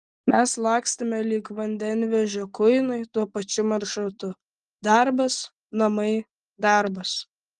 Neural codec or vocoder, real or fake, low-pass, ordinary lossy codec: none; real; 10.8 kHz; Opus, 24 kbps